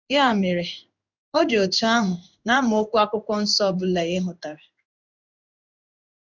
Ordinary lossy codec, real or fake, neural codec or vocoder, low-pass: none; fake; codec, 16 kHz in and 24 kHz out, 1 kbps, XY-Tokenizer; 7.2 kHz